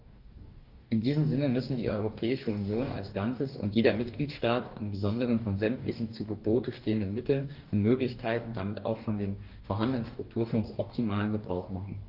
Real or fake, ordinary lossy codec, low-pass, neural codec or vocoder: fake; Opus, 32 kbps; 5.4 kHz; codec, 44.1 kHz, 2.6 kbps, DAC